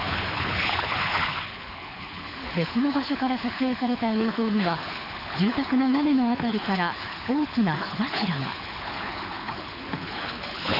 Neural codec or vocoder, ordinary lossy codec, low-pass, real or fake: codec, 24 kHz, 6 kbps, HILCodec; none; 5.4 kHz; fake